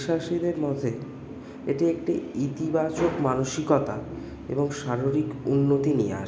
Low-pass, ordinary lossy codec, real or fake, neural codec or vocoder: none; none; real; none